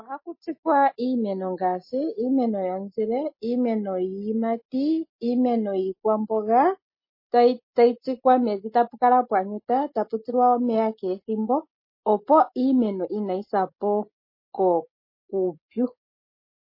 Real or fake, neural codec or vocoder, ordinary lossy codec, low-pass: real; none; MP3, 24 kbps; 5.4 kHz